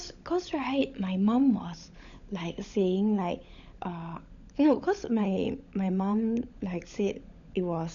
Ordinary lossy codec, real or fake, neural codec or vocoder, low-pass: MP3, 96 kbps; fake; codec, 16 kHz, 16 kbps, FunCodec, trained on LibriTTS, 50 frames a second; 7.2 kHz